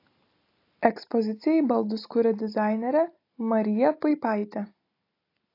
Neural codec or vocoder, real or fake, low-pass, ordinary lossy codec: none; real; 5.4 kHz; AAC, 32 kbps